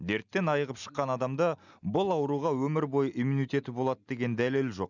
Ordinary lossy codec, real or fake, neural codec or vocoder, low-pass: none; real; none; 7.2 kHz